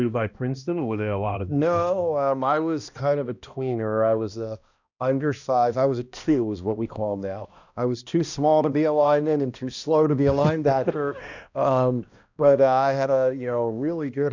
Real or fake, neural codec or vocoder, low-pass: fake; codec, 16 kHz, 1 kbps, X-Codec, HuBERT features, trained on balanced general audio; 7.2 kHz